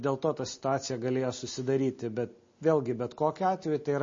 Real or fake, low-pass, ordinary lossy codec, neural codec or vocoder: real; 7.2 kHz; MP3, 32 kbps; none